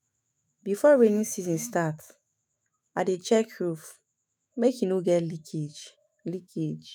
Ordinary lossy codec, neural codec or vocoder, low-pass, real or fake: none; autoencoder, 48 kHz, 128 numbers a frame, DAC-VAE, trained on Japanese speech; none; fake